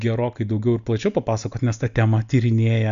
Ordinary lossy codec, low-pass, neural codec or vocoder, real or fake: AAC, 96 kbps; 7.2 kHz; none; real